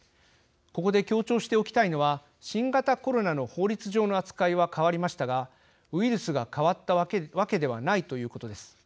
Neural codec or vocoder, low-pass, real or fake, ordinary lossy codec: none; none; real; none